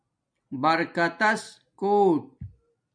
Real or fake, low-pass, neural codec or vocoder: real; 9.9 kHz; none